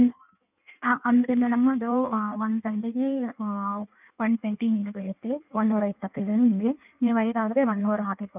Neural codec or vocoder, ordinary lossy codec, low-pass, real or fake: codec, 16 kHz in and 24 kHz out, 1.1 kbps, FireRedTTS-2 codec; none; 3.6 kHz; fake